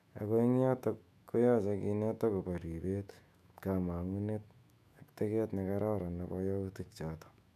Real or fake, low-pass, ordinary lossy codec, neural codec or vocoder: fake; 14.4 kHz; none; autoencoder, 48 kHz, 128 numbers a frame, DAC-VAE, trained on Japanese speech